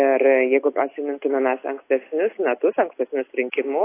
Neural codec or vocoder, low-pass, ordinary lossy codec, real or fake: none; 3.6 kHz; AAC, 24 kbps; real